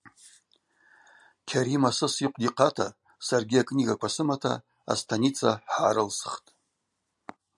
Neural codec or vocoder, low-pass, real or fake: none; 10.8 kHz; real